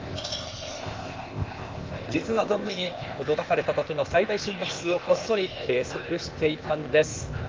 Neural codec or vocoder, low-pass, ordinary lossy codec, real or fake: codec, 16 kHz, 0.8 kbps, ZipCodec; 7.2 kHz; Opus, 32 kbps; fake